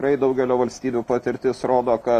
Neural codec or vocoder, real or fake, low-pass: none; real; 14.4 kHz